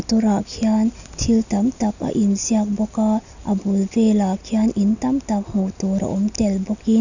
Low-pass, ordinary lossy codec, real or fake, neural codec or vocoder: 7.2 kHz; none; real; none